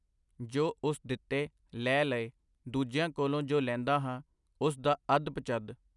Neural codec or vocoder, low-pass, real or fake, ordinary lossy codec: none; 10.8 kHz; real; none